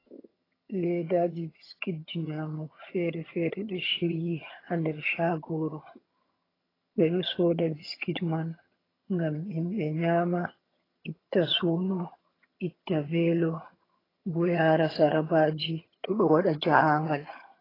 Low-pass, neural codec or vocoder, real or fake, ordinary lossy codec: 5.4 kHz; vocoder, 22.05 kHz, 80 mel bands, HiFi-GAN; fake; AAC, 24 kbps